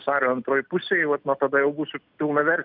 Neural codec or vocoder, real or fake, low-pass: none; real; 5.4 kHz